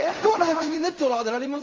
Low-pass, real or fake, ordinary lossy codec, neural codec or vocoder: 7.2 kHz; fake; Opus, 32 kbps; codec, 16 kHz in and 24 kHz out, 0.4 kbps, LongCat-Audio-Codec, fine tuned four codebook decoder